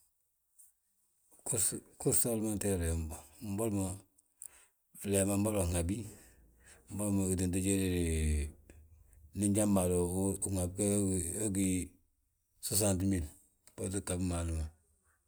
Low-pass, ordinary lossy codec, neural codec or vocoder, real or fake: none; none; none; real